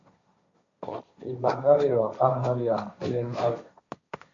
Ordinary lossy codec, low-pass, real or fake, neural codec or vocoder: MP3, 64 kbps; 7.2 kHz; fake; codec, 16 kHz, 1.1 kbps, Voila-Tokenizer